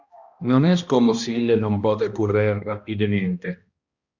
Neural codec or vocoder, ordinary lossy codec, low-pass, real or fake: codec, 16 kHz, 1 kbps, X-Codec, HuBERT features, trained on balanced general audio; Opus, 64 kbps; 7.2 kHz; fake